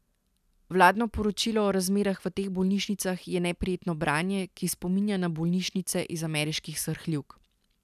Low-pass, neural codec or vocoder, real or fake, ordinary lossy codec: 14.4 kHz; none; real; none